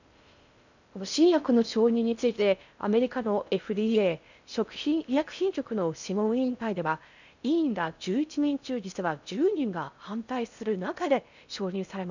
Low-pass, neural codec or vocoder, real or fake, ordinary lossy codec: 7.2 kHz; codec, 16 kHz in and 24 kHz out, 0.6 kbps, FocalCodec, streaming, 4096 codes; fake; none